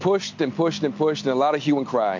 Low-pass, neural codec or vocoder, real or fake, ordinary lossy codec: 7.2 kHz; none; real; MP3, 48 kbps